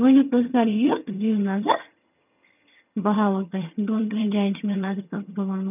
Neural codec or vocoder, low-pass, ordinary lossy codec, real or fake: vocoder, 22.05 kHz, 80 mel bands, HiFi-GAN; 3.6 kHz; none; fake